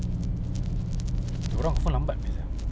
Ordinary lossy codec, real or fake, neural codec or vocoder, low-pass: none; real; none; none